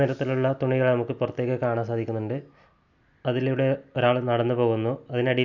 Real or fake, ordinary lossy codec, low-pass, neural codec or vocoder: real; none; 7.2 kHz; none